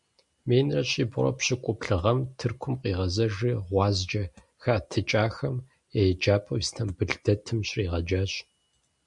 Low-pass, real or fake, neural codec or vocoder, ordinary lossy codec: 10.8 kHz; real; none; MP3, 96 kbps